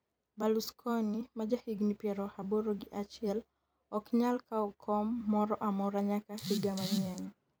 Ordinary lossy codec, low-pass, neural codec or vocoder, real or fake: none; none; vocoder, 44.1 kHz, 128 mel bands every 256 samples, BigVGAN v2; fake